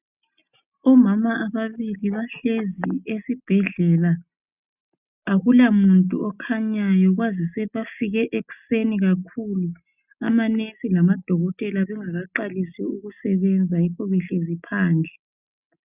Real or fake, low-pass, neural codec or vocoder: real; 3.6 kHz; none